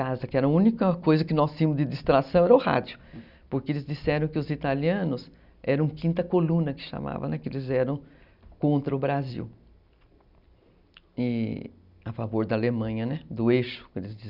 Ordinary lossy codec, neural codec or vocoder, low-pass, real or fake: Opus, 64 kbps; none; 5.4 kHz; real